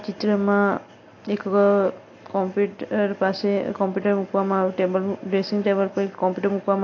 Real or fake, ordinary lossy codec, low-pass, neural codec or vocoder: real; AAC, 48 kbps; 7.2 kHz; none